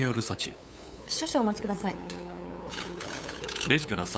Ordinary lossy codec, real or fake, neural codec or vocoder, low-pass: none; fake; codec, 16 kHz, 8 kbps, FunCodec, trained on LibriTTS, 25 frames a second; none